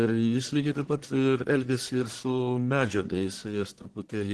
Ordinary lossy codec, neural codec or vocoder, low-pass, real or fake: Opus, 16 kbps; codec, 44.1 kHz, 1.7 kbps, Pupu-Codec; 10.8 kHz; fake